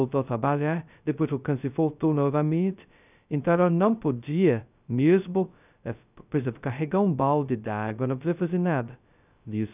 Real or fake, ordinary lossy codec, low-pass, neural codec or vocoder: fake; none; 3.6 kHz; codec, 16 kHz, 0.2 kbps, FocalCodec